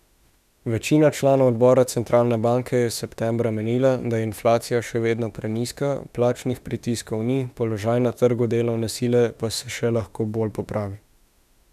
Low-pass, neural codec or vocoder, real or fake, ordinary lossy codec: 14.4 kHz; autoencoder, 48 kHz, 32 numbers a frame, DAC-VAE, trained on Japanese speech; fake; none